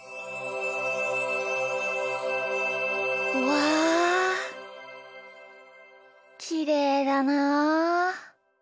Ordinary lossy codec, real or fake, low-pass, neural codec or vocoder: none; real; none; none